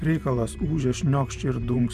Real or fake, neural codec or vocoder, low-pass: fake; vocoder, 44.1 kHz, 128 mel bands every 512 samples, BigVGAN v2; 14.4 kHz